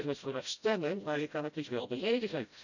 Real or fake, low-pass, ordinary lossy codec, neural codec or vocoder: fake; 7.2 kHz; none; codec, 16 kHz, 0.5 kbps, FreqCodec, smaller model